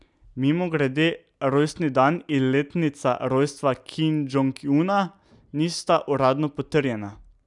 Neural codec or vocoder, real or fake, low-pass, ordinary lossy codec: none; real; 10.8 kHz; none